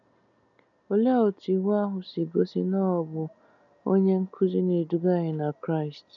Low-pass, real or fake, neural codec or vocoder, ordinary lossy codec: 7.2 kHz; real; none; none